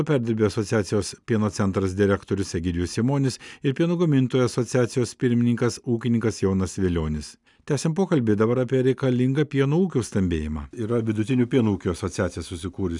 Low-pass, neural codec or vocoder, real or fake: 10.8 kHz; none; real